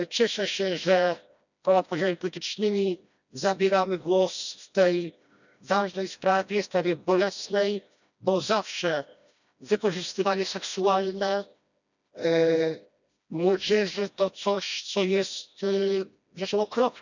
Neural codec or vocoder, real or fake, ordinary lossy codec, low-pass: codec, 16 kHz, 1 kbps, FreqCodec, smaller model; fake; none; 7.2 kHz